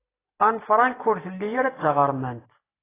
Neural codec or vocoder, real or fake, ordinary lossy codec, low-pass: none; real; AAC, 16 kbps; 3.6 kHz